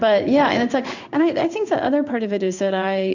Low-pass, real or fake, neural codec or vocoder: 7.2 kHz; fake; codec, 16 kHz in and 24 kHz out, 1 kbps, XY-Tokenizer